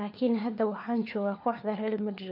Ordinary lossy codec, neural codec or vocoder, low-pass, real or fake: none; codec, 16 kHz, 4 kbps, FunCodec, trained on LibriTTS, 50 frames a second; 5.4 kHz; fake